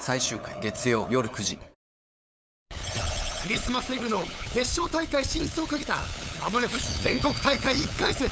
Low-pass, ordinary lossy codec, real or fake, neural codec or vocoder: none; none; fake; codec, 16 kHz, 8 kbps, FunCodec, trained on LibriTTS, 25 frames a second